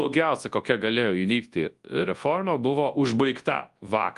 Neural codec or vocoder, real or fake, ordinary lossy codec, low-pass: codec, 24 kHz, 0.9 kbps, WavTokenizer, large speech release; fake; Opus, 32 kbps; 10.8 kHz